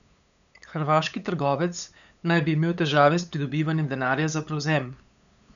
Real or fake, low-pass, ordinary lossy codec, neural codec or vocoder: fake; 7.2 kHz; none; codec, 16 kHz, 8 kbps, FunCodec, trained on LibriTTS, 25 frames a second